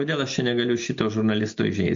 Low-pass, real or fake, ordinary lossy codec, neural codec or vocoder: 7.2 kHz; real; MP3, 48 kbps; none